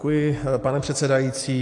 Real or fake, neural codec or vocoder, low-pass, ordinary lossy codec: real; none; 10.8 kHz; AAC, 48 kbps